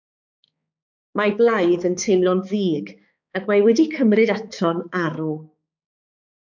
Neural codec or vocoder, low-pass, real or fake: codec, 16 kHz, 4 kbps, X-Codec, HuBERT features, trained on balanced general audio; 7.2 kHz; fake